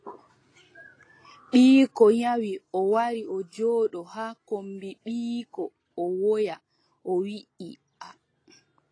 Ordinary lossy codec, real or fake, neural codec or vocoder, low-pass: AAC, 32 kbps; real; none; 9.9 kHz